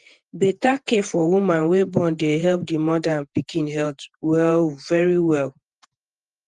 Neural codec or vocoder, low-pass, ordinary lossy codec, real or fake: vocoder, 48 kHz, 128 mel bands, Vocos; 10.8 kHz; Opus, 24 kbps; fake